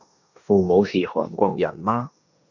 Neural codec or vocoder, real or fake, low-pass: codec, 16 kHz in and 24 kHz out, 0.9 kbps, LongCat-Audio-Codec, four codebook decoder; fake; 7.2 kHz